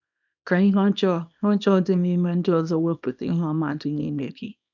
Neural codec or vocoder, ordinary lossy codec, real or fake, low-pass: codec, 24 kHz, 0.9 kbps, WavTokenizer, small release; none; fake; 7.2 kHz